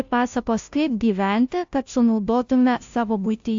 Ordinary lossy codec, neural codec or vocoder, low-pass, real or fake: AAC, 64 kbps; codec, 16 kHz, 0.5 kbps, FunCodec, trained on Chinese and English, 25 frames a second; 7.2 kHz; fake